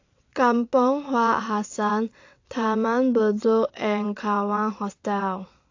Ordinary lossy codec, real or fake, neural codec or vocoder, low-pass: AAC, 48 kbps; fake; vocoder, 22.05 kHz, 80 mel bands, WaveNeXt; 7.2 kHz